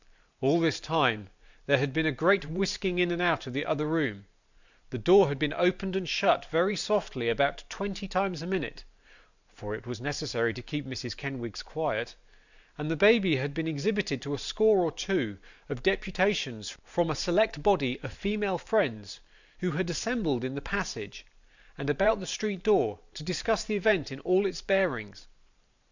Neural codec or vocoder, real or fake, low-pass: vocoder, 44.1 kHz, 128 mel bands every 512 samples, BigVGAN v2; fake; 7.2 kHz